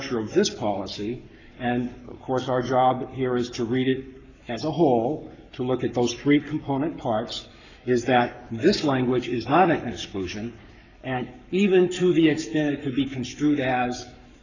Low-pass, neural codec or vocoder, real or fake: 7.2 kHz; codec, 44.1 kHz, 7.8 kbps, Pupu-Codec; fake